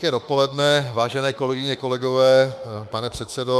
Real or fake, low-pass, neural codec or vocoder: fake; 14.4 kHz; autoencoder, 48 kHz, 32 numbers a frame, DAC-VAE, trained on Japanese speech